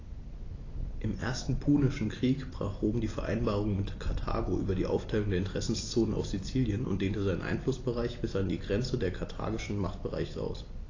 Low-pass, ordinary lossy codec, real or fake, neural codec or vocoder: 7.2 kHz; AAC, 32 kbps; real; none